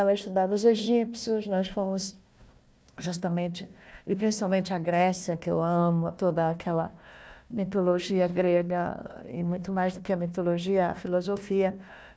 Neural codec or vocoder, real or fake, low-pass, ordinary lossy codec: codec, 16 kHz, 1 kbps, FunCodec, trained on Chinese and English, 50 frames a second; fake; none; none